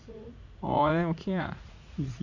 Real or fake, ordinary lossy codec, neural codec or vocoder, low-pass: fake; AAC, 48 kbps; vocoder, 44.1 kHz, 80 mel bands, Vocos; 7.2 kHz